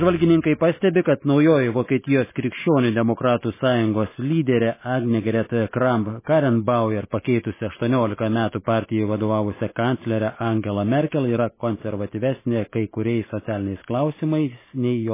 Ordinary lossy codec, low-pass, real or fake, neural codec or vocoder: MP3, 16 kbps; 3.6 kHz; real; none